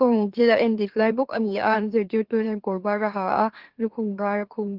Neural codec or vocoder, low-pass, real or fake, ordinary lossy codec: autoencoder, 44.1 kHz, a latent of 192 numbers a frame, MeloTTS; 5.4 kHz; fake; Opus, 16 kbps